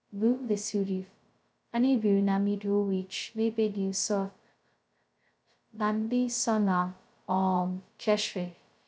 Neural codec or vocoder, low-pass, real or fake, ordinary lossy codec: codec, 16 kHz, 0.2 kbps, FocalCodec; none; fake; none